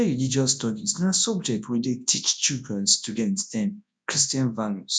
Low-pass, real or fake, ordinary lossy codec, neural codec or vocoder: 9.9 kHz; fake; none; codec, 24 kHz, 0.9 kbps, WavTokenizer, large speech release